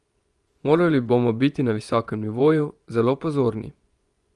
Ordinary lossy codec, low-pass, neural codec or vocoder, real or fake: Opus, 32 kbps; 10.8 kHz; vocoder, 24 kHz, 100 mel bands, Vocos; fake